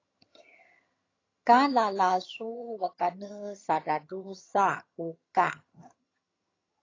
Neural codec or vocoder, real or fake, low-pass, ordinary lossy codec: vocoder, 22.05 kHz, 80 mel bands, HiFi-GAN; fake; 7.2 kHz; AAC, 32 kbps